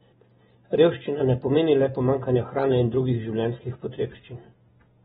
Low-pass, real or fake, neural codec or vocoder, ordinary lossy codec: 19.8 kHz; real; none; AAC, 16 kbps